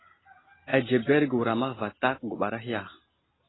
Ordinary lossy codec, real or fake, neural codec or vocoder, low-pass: AAC, 16 kbps; real; none; 7.2 kHz